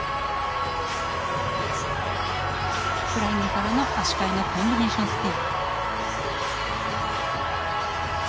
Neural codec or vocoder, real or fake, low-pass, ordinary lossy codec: none; real; none; none